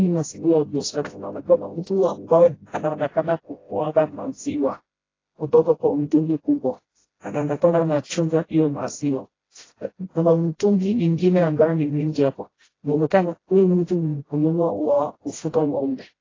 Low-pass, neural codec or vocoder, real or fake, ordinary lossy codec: 7.2 kHz; codec, 16 kHz, 0.5 kbps, FreqCodec, smaller model; fake; AAC, 32 kbps